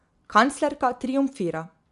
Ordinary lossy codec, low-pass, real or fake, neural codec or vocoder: AAC, 96 kbps; 10.8 kHz; real; none